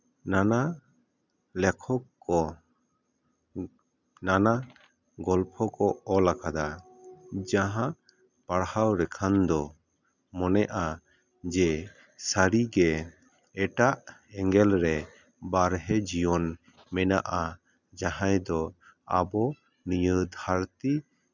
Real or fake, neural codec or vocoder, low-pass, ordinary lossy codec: real; none; 7.2 kHz; none